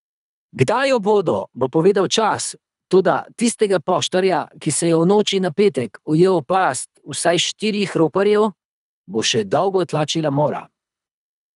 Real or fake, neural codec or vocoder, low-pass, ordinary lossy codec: fake; codec, 24 kHz, 3 kbps, HILCodec; 10.8 kHz; none